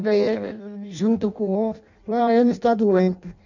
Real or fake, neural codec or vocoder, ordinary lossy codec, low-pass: fake; codec, 16 kHz in and 24 kHz out, 0.6 kbps, FireRedTTS-2 codec; none; 7.2 kHz